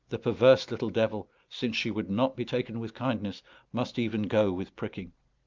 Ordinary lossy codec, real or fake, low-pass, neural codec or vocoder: Opus, 24 kbps; real; 7.2 kHz; none